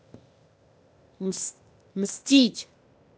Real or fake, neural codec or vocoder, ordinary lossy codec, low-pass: fake; codec, 16 kHz, 0.8 kbps, ZipCodec; none; none